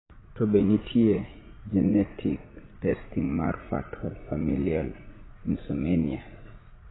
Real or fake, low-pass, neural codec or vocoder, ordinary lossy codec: fake; 7.2 kHz; vocoder, 44.1 kHz, 80 mel bands, Vocos; AAC, 16 kbps